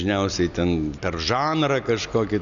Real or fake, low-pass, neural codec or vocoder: real; 7.2 kHz; none